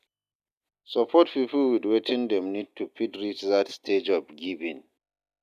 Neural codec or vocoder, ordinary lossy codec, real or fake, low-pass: none; none; real; 14.4 kHz